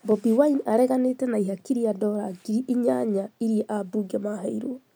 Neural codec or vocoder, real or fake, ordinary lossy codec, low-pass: none; real; none; none